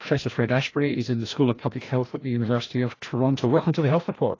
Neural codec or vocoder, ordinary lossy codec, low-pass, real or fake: codec, 16 kHz, 1 kbps, FreqCodec, larger model; AAC, 32 kbps; 7.2 kHz; fake